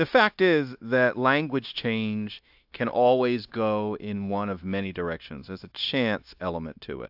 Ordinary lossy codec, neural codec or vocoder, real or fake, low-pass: AAC, 48 kbps; codec, 16 kHz, 0.9 kbps, LongCat-Audio-Codec; fake; 5.4 kHz